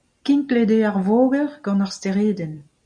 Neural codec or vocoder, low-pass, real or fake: none; 9.9 kHz; real